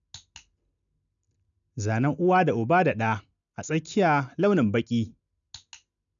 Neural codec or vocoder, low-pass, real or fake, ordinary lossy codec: none; 7.2 kHz; real; none